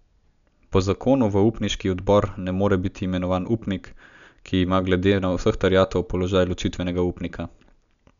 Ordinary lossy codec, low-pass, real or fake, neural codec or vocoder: none; 7.2 kHz; real; none